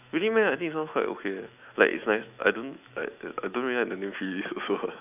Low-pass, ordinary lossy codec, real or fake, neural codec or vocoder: 3.6 kHz; none; fake; autoencoder, 48 kHz, 128 numbers a frame, DAC-VAE, trained on Japanese speech